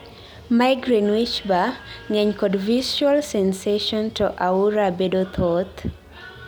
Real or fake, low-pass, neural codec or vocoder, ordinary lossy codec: real; none; none; none